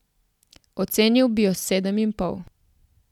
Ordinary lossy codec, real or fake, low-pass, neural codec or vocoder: none; real; 19.8 kHz; none